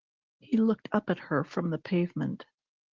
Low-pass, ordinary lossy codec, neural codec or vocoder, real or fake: 7.2 kHz; Opus, 16 kbps; none; real